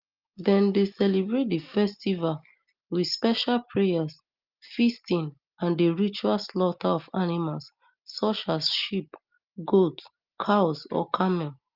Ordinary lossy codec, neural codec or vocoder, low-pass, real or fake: Opus, 24 kbps; none; 5.4 kHz; real